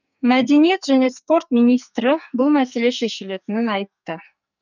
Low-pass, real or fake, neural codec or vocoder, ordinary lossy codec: 7.2 kHz; fake; codec, 44.1 kHz, 2.6 kbps, SNAC; none